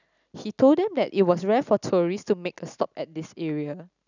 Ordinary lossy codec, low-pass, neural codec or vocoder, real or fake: none; 7.2 kHz; none; real